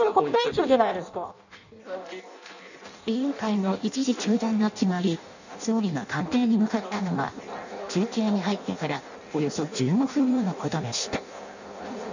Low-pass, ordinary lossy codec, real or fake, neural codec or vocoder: 7.2 kHz; none; fake; codec, 16 kHz in and 24 kHz out, 0.6 kbps, FireRedTTS-2 codec